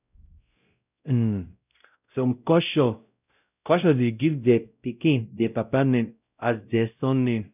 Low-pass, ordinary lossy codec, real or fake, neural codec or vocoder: 3.6 kHz; none; fake; codec, 16 kHz, 0.5 kbps, X-Codec, WavLM features, trained on Multilingual LibriSpeech